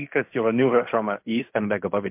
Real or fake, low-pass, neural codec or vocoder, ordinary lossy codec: fake; 3.6 kHz; codec, 16 kHz in and 24 kHz out, 0.4 kbps, LongCat-Audio-Codec, fine tuned four codebook decoder; MP3, 32 kbps